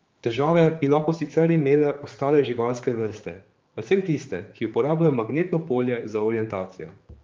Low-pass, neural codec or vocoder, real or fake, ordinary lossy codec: 7.2 kHz; codec, 16 kHz, 4 kbps, X-Codec, HuBERT features, trained on general audio; fake; Opus, 32 kbps